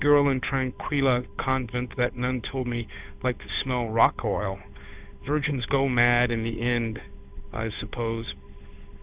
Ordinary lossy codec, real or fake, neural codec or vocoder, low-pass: Opus, 64 kbps; fake; codec, 16 kHz, 8 kbps, FunCodec, trained on Chinese and English, 25 frames a second; 3.6 kHz